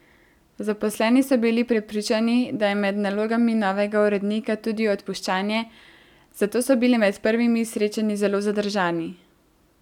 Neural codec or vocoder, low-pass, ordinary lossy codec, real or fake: none; 19.8 kHz; none; real